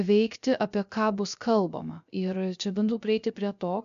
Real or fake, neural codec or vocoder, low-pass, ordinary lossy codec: fake; codec, 16 kHz, 0.3 kbps, FocalCodec; 7.2 kHz; AAC, 96 kbps